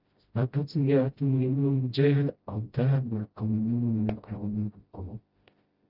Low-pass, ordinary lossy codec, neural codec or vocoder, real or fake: 5.4 kHz; Opus, 24 kbps; codec, 16 kHz, 0.5 kbps, FreqCodec, smaller model; fake